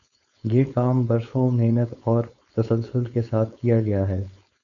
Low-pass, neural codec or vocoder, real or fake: 7.2 kHz; codec, 16 kHz, 4.8 kbps, FACodec; fake